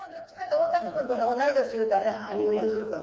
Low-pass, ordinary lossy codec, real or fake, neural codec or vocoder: none; none; fake; codec, 16 kHz, 2 kbps, FreqCodec, smaller model